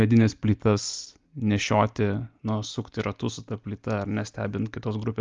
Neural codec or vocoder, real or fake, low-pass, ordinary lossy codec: none; real; 7.2 kHz; Opus, 32 kbps